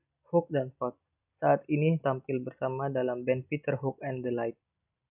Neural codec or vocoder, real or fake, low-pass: none; real; 3.6 kHz